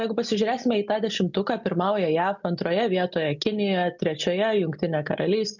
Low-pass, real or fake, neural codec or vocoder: 7.2 kHz; real; none